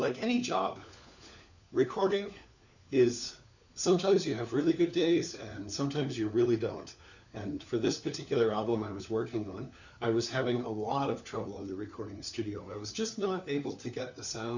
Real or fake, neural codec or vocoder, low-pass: fake; codec, 16 kHz, 4 kbps, FunCodec, trained on LibriTTS, 50 frames a second; 7.2 kHz